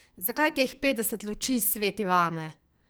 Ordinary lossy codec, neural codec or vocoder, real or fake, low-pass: none; codec, 44.1 kHz, 2.6 kbps, SNAC; fake; none